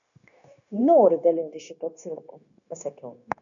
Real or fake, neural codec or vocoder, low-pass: fake; codec, 16 kHz, 0.9 kbps, LongCat-Audio-Codec; 7.2 kHz